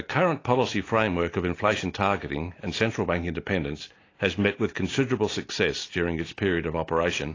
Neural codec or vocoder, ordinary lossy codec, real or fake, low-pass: none; AAC, 32 kbps; real; 7.2 kHz